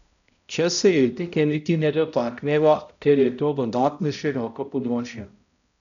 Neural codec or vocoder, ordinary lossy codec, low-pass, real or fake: codec, 16 kHz, 0.5 kbps, X-Codec, HuBERT features, trained on balanced general audio; none; 7.2 kHz; fake